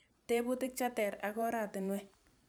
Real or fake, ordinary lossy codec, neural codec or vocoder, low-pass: fake; none; vocoder, 44.1 kHz, 128 mel bands every 512 samples, BigVGAN v2; none